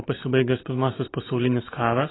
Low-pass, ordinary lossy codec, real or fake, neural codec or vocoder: 7.2 kHz; AAC, 16 kbps; real; none